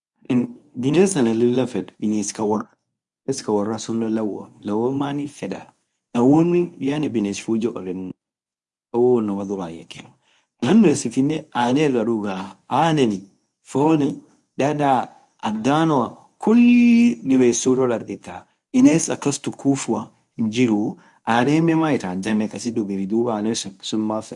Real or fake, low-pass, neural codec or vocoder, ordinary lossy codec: fake; 10.8 kHz; codec, 24 kHz, 0.9 kbps, WavTokenizer, medium speech release version 1; none